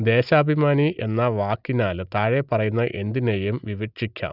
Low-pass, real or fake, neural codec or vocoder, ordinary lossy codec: 5.4 kHz; real; none; none